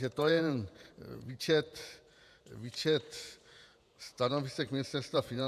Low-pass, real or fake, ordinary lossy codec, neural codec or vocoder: 14.4 kHz; fake; MP3, 96 kbps; vocoder, 48 kHz, 128 mel bands, Vocos